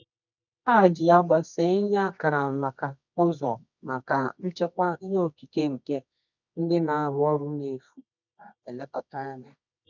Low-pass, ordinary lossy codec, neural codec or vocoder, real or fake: 7.2 kHz; none; codec, 24 kHz, 0.9 kbps, WavTokenizer, medium music audio release; fake